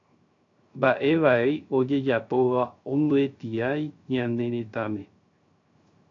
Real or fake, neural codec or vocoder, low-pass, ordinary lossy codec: fake; codec, 16 kHz, 0.3 kbps, FocalCodec; 7.2 kHz; AAC, 48 kbps